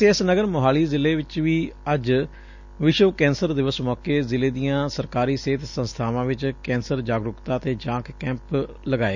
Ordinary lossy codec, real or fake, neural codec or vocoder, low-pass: none; real; none; 7.2 kHz